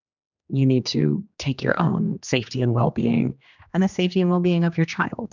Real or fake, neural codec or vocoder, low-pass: fake; codec, 16 kHz, 2 kbps, X-Codec, HuBERT features, trained on general audio; 7.2 kHz